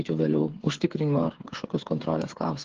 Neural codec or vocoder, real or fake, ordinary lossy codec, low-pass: codec, 16 kHz, 8 kbps, FreqCodec, smaller model; fake; Opus, 16 kbps; 7.2 kHz